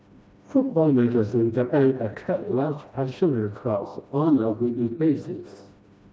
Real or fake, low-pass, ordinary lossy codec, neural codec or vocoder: fake; none; none; codec, 16 kHz, 1 kbps, FreqCodec, smaller model